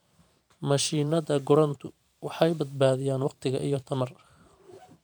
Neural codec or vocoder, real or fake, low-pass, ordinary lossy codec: vocoder, 44.1 kHz, 128 mel bands every 512 samples, BigVGAN v2; fake; none; none